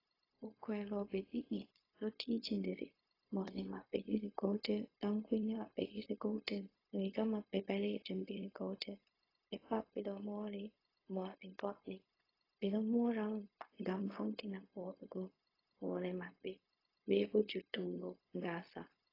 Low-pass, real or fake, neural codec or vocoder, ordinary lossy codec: 5.4 kHz; fake; codec, 16 kHz, 0.4 kbps, LongCat-Audio-Codec; AAC, 24 kbps